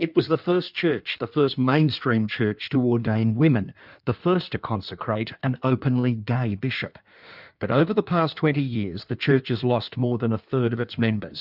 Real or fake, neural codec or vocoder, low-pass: fake; codec, 16 kHz in and 24 kHz out, 1.1 kbps, FireRedTTS-2 codec; 5.4 kHz